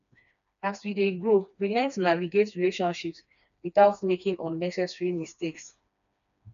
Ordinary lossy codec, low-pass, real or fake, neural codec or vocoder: none; 7.2 kHz; fake; codec, 16 kHz, 2 kbps, FreqCodec, smaller model